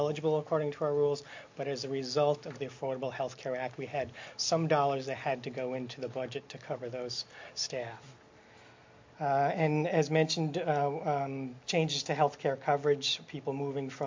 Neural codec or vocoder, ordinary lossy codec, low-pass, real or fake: none; MP3, 48 kbps; 7.2 kHz; real